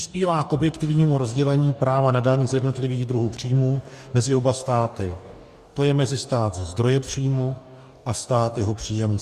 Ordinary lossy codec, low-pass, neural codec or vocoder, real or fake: Opus, 64 kbps; 14.4 kHz; codec, 44.1 kHz, 2.6 kbps, DAC; fake